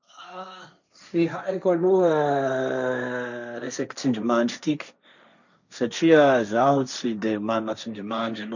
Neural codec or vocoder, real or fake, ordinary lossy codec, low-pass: codec, 16 kHz, 1.1 kbps, Voila-Tokenizer; fake; none; 7.2 kHz